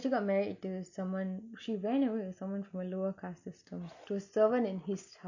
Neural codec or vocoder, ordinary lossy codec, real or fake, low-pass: none; none; real; 7.2 kHz